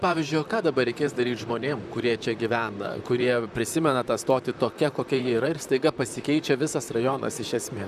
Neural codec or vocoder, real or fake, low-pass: vocoder, 44.1 kHz, 128 mel bands, Pupu-Vocoder; fake; 14.4 kHz